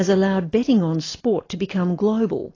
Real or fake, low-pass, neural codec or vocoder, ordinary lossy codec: real; 7.2 kHz; none; AAC, 32 kbps